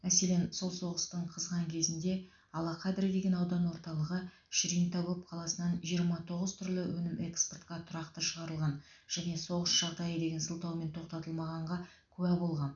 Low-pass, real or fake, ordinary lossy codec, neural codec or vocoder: 7.2 kHz; real; none; none